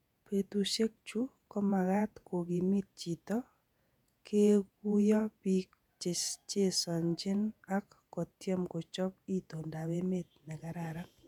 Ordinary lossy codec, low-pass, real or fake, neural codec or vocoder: none; 19.8 kHz; fake; vocoder, 48 kHz, 128 mel bands, Vocos